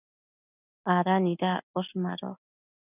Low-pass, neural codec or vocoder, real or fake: 3.6 kHz; none; real